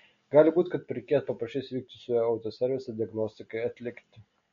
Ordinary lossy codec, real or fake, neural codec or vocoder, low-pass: MP3, 48 kbps; real; none; 7.2 kHz